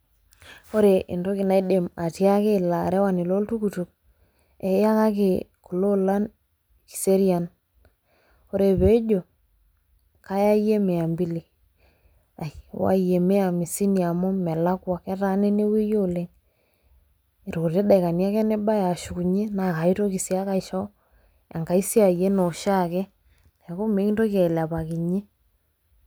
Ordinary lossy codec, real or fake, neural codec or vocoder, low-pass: none; real; none; none